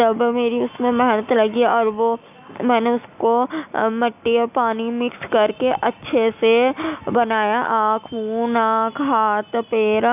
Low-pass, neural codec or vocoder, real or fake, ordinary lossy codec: 3.6 kHz; none; real; none